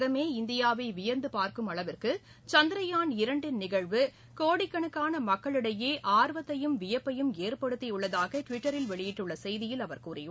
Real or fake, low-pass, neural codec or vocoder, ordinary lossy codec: real; none; none; none